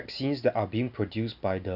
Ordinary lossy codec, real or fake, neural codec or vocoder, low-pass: none; real; none; 5.4 kHz